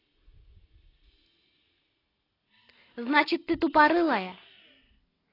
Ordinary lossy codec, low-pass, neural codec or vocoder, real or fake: AAC, 24 kbps; 5.4 kHz; none; real